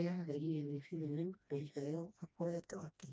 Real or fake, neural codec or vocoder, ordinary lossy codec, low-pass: fake; codec, 16 kHz, 1 kbps, FreqCodec, smaller model; none; none